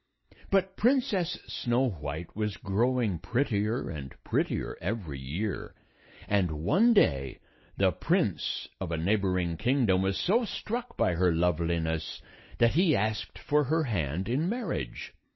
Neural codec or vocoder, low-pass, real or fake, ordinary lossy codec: none; 7.2 kHz; real; MP3, 24 kbps